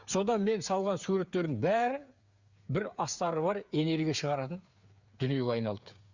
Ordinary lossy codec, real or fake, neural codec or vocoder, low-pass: Opus, 64 kbps; fake; codec, 16 kHz, 8 kbps, FreqCodec, smaller model; 7.2 kHz